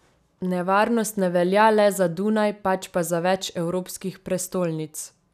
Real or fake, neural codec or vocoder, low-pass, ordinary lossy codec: real; none; 14.4 kHz; none